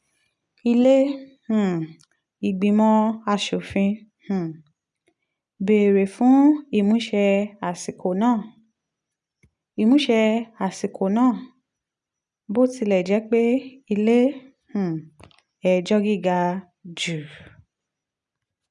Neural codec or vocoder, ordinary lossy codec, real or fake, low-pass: none; none; real; 10.8 kHz